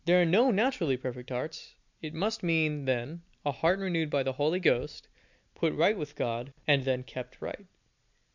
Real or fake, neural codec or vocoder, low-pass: real; none; 7.2 kHz